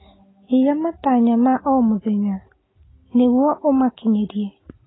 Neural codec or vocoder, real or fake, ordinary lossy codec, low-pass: none; real; AAC, 16 kbps; 7.2 kHz